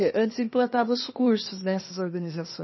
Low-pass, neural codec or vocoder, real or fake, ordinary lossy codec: 7.2 kHz; codec, 16 kHz, 0.8 kbps, ZipCodec; fake; MP3, 24 kbps